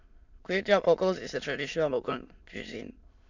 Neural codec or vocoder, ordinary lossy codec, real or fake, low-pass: autoencoder, 22.05 kHz, a latent of 192 numbers a frame, VITS, trained on many speakers; none; fake; 7.2 kHz